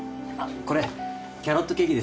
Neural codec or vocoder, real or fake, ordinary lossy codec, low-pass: none; real; none; none